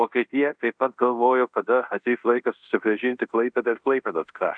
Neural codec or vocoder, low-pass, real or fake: codec, 24 kHz, 0.5 kbps, DualCodec; 9.9 kHz; fake